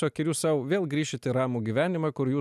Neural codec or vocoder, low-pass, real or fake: none; 14.4 kHz; real